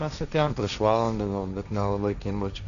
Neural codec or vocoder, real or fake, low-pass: codec, 16 kHz, 1.1 kbps, Voila-Tokenizer; fake; 7.2 kHz